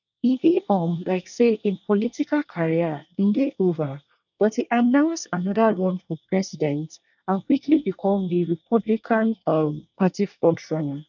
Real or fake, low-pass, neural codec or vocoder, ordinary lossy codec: fake; 7.2 kHz; codec, 24 kHz, 1 kbps, SNAC; none